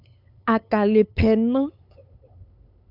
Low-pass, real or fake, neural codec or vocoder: 5.4 kHz; fake; codec, 16 kHz, 8 kbps, FunCodec, trained on LibriTTS, 25 frames a second